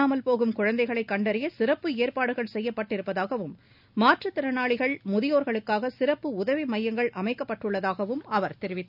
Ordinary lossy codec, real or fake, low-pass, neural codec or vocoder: none; real; 5.4 kHz; none